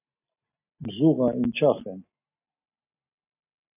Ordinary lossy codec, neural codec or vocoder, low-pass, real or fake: AAC, 32 kbps; none; 3.6 kHz; real